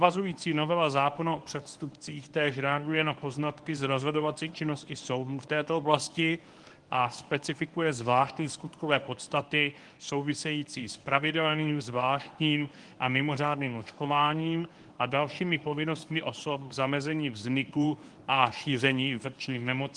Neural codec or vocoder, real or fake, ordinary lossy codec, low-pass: codec, 24 kHz, 0.9 kbps, WavTokenizer, medium speech release version 1; fake; Opus, 24 kbps; 10.8 kHz